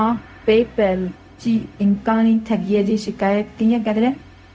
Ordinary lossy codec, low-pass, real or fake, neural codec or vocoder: none; none; fake; codec, 16 kHz, 0.4 kbps, LongCat-Audio-Codec